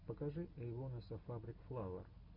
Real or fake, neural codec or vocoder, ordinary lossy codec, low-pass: real; none; MP3, 48 kbps; 5.4 kHz